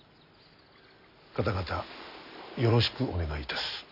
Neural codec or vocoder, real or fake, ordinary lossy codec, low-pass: none; real; none; 5.4 kHz